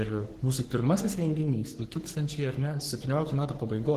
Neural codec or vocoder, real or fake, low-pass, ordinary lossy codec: codec, 44.1 kHz, 3.4 kbps, Pupu-Codec; fake; 14.4 kHz; Opus, 16 kbps